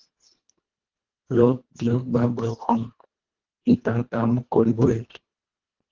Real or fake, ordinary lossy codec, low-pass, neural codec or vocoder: fake; Opus, 16 kbps; 7.2 kHz; codec, 24 kHz, 1.5 kbps, HILCodec